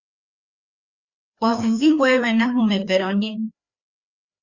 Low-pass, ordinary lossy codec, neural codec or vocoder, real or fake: 7.2 kHz; Opus, 64 kbps; codec, 16 kHz, 2 kbps, FreqCodec, larger model; fake